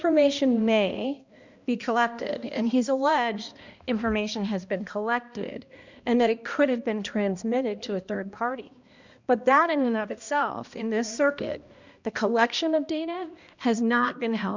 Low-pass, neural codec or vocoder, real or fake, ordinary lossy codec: 7.2 kHz; codec, 16 kHz, 1 kbps, X-Codec, HuBERT features, trained on balanced general audio; fake; Opus, 64 kbps